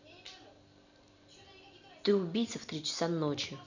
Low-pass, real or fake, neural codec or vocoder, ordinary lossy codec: 7.2 kHz; real; none; none